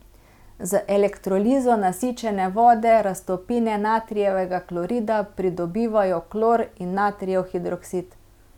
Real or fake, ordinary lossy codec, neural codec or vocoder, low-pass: real; none; none; 19.8 kHz